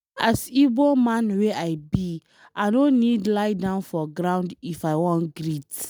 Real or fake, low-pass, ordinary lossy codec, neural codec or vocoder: real; none; none; none